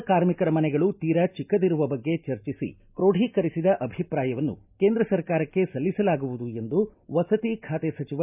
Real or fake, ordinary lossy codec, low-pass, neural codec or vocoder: real; none; 3.6 kHz; none